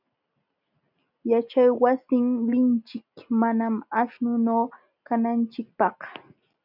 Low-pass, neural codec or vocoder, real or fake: 5.4 kHz; none; real